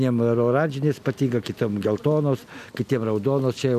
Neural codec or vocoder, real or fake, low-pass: none; real; 14.4 kHz